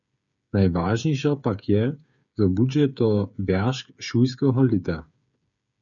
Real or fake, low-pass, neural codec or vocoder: fake; 7.2 kHz; codec, 16 kHz, 8 kbps, FreqCodec, smaller model